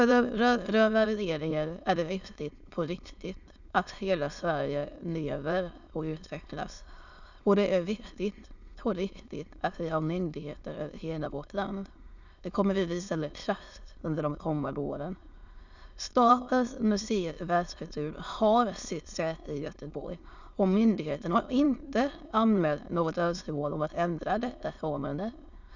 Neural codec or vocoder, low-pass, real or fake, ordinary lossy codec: autoencoder, 22.05 kHz, a latent of 192 numbers a frame, VITS, trained on many speakers; 7.2 kHz; fake; none